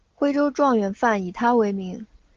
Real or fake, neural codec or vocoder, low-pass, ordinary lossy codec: real; none; 7.2 kHz; Opus, 16 kbps